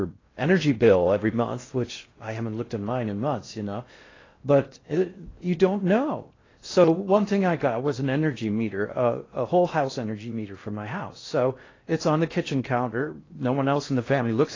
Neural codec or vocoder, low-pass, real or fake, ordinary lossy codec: codec, 16 kHz in and 24 kHz out, 0.6 kbps, FocalCodec, streaming, 4096 codes; 7.2 kHz; fake; AAC, 32 kbps